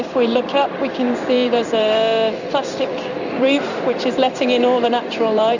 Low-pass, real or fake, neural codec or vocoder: 7.2 kHz; fake; codec, 16 kHz in and 24 kHz out, 1 kbps, XY-Tokenizer